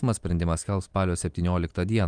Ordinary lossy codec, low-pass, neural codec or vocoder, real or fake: Opus, 32 kbps; 9.9 kHz; none; real